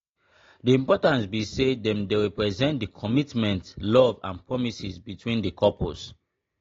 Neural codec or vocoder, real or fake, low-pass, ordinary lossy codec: none; real; 7.2 kHz; AAC, 32 kbps